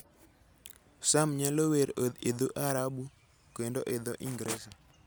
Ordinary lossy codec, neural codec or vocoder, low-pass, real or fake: none; none; none; real